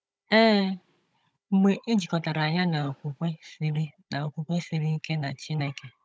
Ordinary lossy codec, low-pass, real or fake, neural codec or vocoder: none; none; fake; codec, 16 kHz, 16 kbps, FunCodec, trained on Chinese and English, 50 frames a second